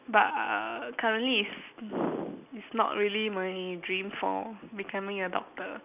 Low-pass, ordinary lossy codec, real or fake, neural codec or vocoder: 3.6 kHz; none; real; none